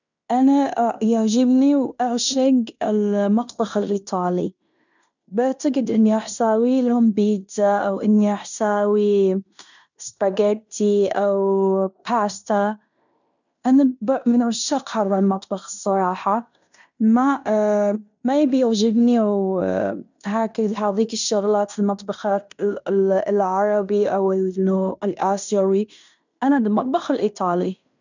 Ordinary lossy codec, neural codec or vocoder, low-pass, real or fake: none; codec, 16 kHz in and 24 kHz out, 0.9 kbps, LongCat-Audio-Codec, fine tuned four codebook decoder; 7.2 kHz; fake